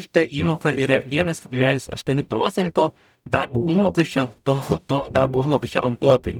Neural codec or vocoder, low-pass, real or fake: codec, 44.1 kHz, 0.9 kbps, DAC; 19.8 kHz; fake